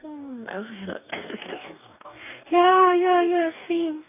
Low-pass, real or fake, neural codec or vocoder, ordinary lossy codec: 3.6 kHz; fake; codec, 44.1 kHz, 2.6 kbps, DAC; none